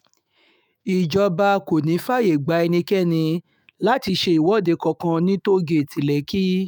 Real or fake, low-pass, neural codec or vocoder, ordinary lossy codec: fake; none; autoencoder, 48 kHz, 128 numbers a frame, DAC-VAE, trained on Japanese speech; none